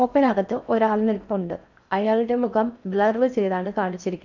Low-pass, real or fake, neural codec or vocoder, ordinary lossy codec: 7.2 kHz; fake; codec, 16 kHz in and 24 kHz out, 0.6 kbps, FocalCodec, streaming, 4096 codes; none